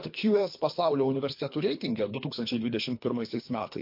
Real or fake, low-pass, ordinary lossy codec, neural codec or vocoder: fake; 5.4 kHz; MP3, 32 kbps; codec, 24 kHz, 3 kbps, HILCodec